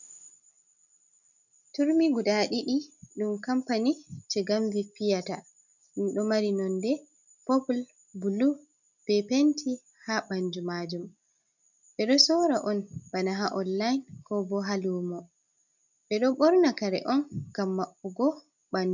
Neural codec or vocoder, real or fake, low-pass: none; real; 7.2 kHz